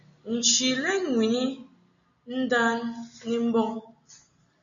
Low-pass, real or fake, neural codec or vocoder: 7.2 kHz; real; none